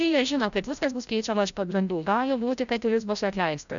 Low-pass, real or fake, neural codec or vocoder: 7.2 kHz; fake; codec, 16 kHz, 0.5 kbps, FreqCodec, larger model